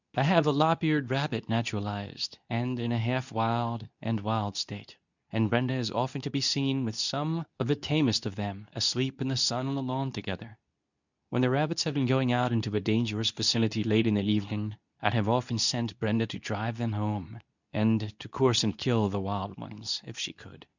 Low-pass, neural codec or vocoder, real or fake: 7.2 kHz; codec, 24 kHz, 0.9 kbps, WavTokenizer, medium speech release version 2; fake